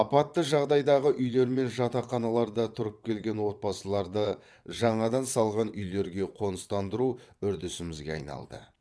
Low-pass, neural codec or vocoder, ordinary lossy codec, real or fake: none; vocoder, 22.05 kHz, 80 mel bands, WaveNeXt; none; fake